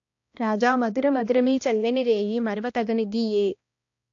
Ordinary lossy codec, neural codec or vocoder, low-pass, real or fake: AAC, 48 kbps; codec, 16 kHz, 1 kbps, X-Codec, HuBERT features, trained on balanced general audio; 7.2 kHz; fake